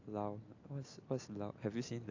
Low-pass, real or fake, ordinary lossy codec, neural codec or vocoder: 7.2 kHz; real; AAC, 48 kbps; none